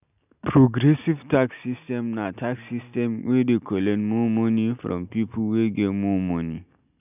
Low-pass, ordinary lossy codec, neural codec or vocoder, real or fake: 3.6 kHz; none; none; real